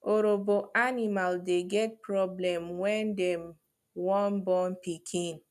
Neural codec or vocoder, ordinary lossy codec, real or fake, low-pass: none; none; real; 14.4 kHz